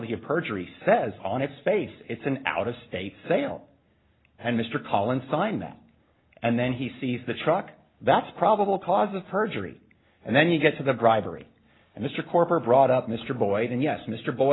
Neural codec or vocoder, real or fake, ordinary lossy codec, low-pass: none; real; AAC, 16 kbps; 7.2 kHz